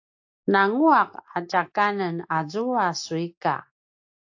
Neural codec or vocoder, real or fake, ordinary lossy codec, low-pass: none; real; AAC, 48 kbps; 7.2 kHz